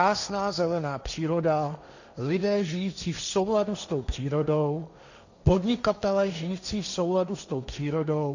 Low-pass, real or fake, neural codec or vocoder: 7.2 kHz; fake; codec, 16 kHz, 1.1 kbps, Voila-Tokenizer